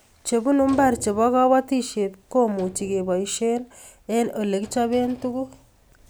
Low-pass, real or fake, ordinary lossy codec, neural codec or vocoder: none; real; none; none